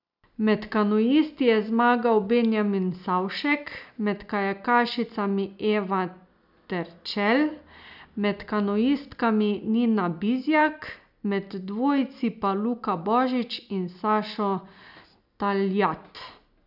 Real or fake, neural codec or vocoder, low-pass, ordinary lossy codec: real; none; 5.4 kHz; none